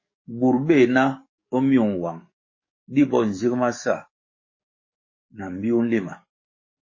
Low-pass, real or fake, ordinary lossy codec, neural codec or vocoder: 7.2 kHz; fake; MP3, 32 kbps; codec, 44.1 kHz, 7.8 kbps, DAC